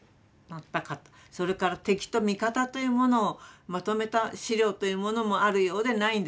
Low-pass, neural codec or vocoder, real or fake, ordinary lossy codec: none; none; real; none